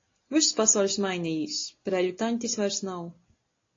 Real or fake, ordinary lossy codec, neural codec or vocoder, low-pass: real; AAC, 32 kbps; none; 7.2 kHz